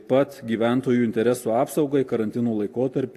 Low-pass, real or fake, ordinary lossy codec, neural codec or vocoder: 14.4 kHz; real; AAC, 64 kbps; none